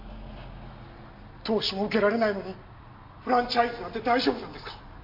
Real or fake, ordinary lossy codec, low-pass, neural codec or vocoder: real; MP3, 32 kbps; 5.4 kHz; none